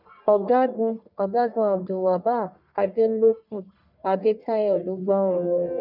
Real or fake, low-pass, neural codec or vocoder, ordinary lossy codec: fake; 5.4 kHz; codec, 44.1 kHz, 1.7 kbps, Pupu-Codec; none